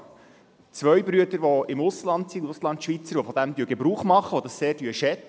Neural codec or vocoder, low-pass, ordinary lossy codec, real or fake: none; none; none; real